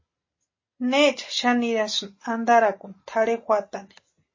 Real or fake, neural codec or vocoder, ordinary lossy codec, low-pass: real; none; MP3, 32 kbps; 7.2 kHz